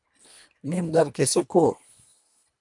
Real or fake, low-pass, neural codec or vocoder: fake; 10.8 kHz; codec, 24 kHz, 1.5 kbps, HILCodec